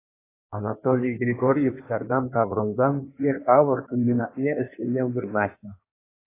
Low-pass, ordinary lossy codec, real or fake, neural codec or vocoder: 3.6 kHz; AAC, 24 kbps; fake; codec, 16 kHz in and 24 kHz out, 1.1 kbps, FireRedTTS-2 codec